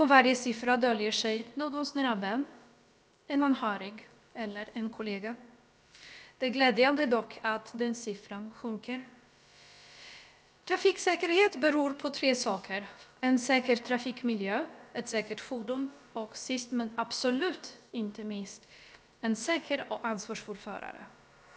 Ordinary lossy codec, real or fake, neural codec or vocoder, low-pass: none; fake; codec, 16 kHz, about 1 kbps, DyCAST, with the encoder's durations; none